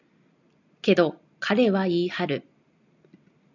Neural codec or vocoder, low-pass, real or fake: none; 7.2 kHz; real